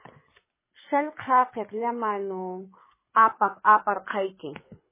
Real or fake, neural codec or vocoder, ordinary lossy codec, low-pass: fake; codec, 24 kHz, 6 kbps, HILCodec; MP3, 16 kbps; 3.6 kHz